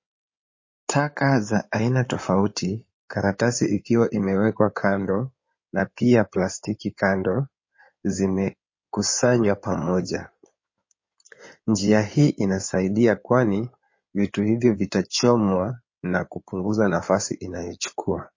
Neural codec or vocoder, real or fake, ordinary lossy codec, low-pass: codec, 16 kHz in and 24 kHz out, 2.2 kbps, FireRedTTS-2 codec; fake; MP3, 32 kbps; 7.2 kHz